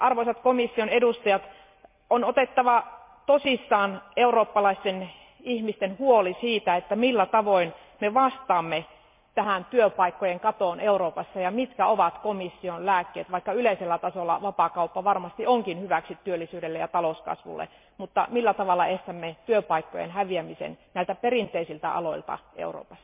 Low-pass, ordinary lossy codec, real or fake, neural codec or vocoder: 3.6 kHz; MP3, 32 kbps; real; none